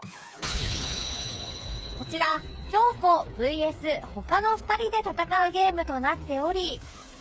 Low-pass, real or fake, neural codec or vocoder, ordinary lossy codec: none; fake; codec, 16 kHz, 4 kbps, FreqCodec, smaller model; none